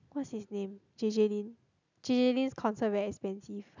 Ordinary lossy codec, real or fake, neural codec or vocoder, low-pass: none; real; none; 7.2 kHz